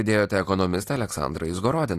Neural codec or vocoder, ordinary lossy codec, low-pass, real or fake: none; AAC, 48 kbps; 14.4 kHz; real